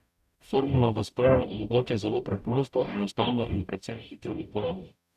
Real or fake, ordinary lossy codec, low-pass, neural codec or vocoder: fake; none; 14.4 kHz; codec, 44.1 kHz, 0.9 kbps, DAC